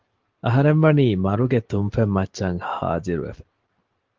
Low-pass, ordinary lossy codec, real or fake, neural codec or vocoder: 7.2 kHz; Opus, 16 kbps; real; none